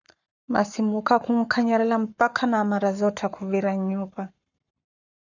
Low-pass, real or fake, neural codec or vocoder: 7.2 kHz; fake; codec, 44.1 kHz, 7.8 kbps, Pupu-Codec